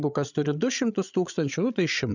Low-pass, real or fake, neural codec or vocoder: 7.2 kHz; fake; codec, 16 kHz, 8 kbps, FreqCodec, larger model